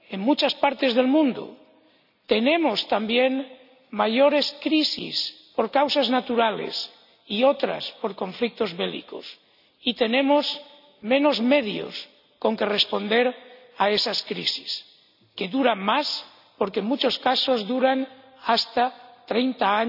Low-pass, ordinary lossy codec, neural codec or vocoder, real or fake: 5.4 kHz; none; none; real